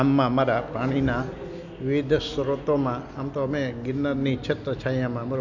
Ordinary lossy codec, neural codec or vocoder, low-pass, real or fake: none; none; 7.2 kHz; real